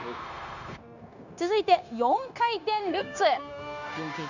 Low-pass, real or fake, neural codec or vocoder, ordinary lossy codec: 7.2 kHz; fake; codec, 16 kHz, 0.9 kbps, LongCat-Audio-Codec; none